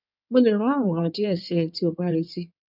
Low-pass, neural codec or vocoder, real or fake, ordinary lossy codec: 5.4 kHz; codec, 16 kHz in and 24 kHz out, 2.2 kbps, FireRedTTS-2 codec; fake; none